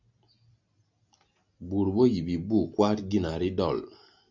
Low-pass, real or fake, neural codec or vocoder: 7.2 kHz; real; none